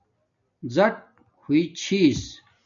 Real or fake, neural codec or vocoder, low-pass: real; none; 7.2 kHz